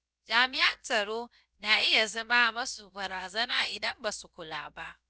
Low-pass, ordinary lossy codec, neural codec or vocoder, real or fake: none; none; codec, 16 kHz, about 1 kbps, DyCAST, with the encoder's durations; fake